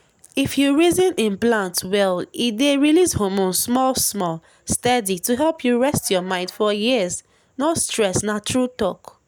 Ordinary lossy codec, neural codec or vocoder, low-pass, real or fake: none; none; none; real